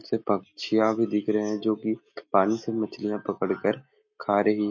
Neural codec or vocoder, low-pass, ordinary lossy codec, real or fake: none; 7.2 kHz; MP3, 32 kbps; real